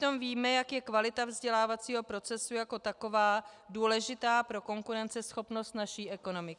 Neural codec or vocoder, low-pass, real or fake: none; 10.8 kHz; real